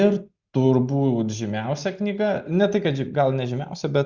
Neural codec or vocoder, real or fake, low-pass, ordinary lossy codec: none; real; 7.2 kHz; Opus, 64 kbps